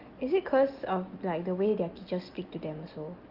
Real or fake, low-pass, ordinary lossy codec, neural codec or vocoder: real; 5.4 kHz; Opus, 32 kbps; none